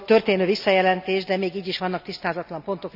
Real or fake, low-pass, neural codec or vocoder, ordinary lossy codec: real; 5.4 kHz; none; none